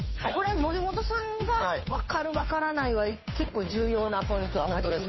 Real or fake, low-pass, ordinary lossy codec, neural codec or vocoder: fake; 7.2 kHz; MP3, 24 kbps; codec, 16 kHz, 2 kbps, FunCodec, trained on Chinese and English, 25 frames a second